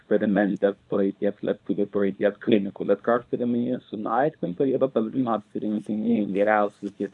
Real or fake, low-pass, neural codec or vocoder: fake; 10.8 kHz; codec, 24 kHz, 0.9 kbps, WavTokenizer, small release